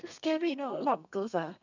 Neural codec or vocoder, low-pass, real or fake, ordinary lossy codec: codec, 32 kHz, 1.9 kbps, SNAC; 7.2 kHz; fake; none